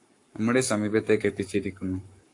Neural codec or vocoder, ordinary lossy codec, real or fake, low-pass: codec, 44.1 kHz, 7.8 kbps, Pupu-Codec; AAC, 48 kbps; fake; 10.8 kHz